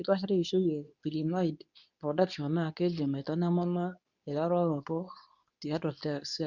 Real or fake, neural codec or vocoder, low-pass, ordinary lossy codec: fake; codec, 24 kHz, 0.9 kbps, WavTokenizer, medium speech release version 2; 7.2 kHz; none